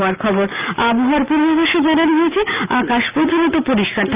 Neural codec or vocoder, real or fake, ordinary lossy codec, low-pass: none; real; Opus, 24 kbps; 3.6 kHz